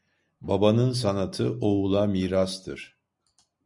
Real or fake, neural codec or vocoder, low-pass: real; none; 10.8 kHz